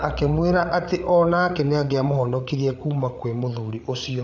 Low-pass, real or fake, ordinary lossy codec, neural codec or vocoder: 7.2 kHz; fake; none; codec, 16 kHz, 8 kbps, FreqCodec, larger model